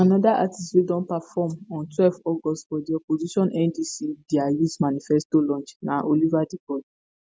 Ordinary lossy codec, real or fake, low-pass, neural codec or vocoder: none; real; none; none